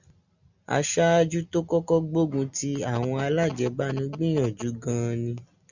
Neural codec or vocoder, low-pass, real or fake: none; 7.2 kHz; real